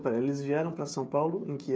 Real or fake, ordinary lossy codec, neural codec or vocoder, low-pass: fake; none; codec, 16 kHz, 16 kbps, FreqCodec, smaller model; none